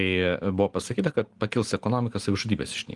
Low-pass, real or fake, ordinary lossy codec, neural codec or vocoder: 10.8 kHz; real; Opus, 24 kbps; none